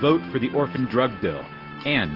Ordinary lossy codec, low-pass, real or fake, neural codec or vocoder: Opus, 16 kbps; 5.4 kHz; real; none